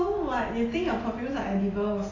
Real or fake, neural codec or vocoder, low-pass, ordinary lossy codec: real; none; 7.2 kHz; AAC, 32 kbps